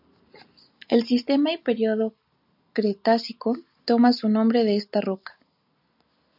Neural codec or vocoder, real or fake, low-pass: none; real; 5.4 kHz